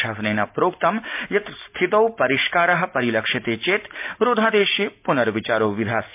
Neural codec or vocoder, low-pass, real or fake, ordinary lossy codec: none; 3.6 kHz; real; MP3, 32 kbps